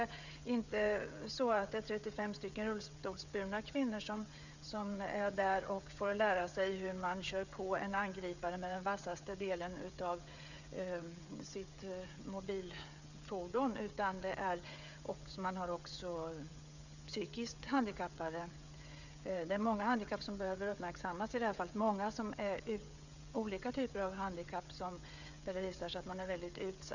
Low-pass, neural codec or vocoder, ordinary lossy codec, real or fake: 7.2 kHz; codec, 16 kHz, 16 kbps, FreqCodec, smaller model; none; fake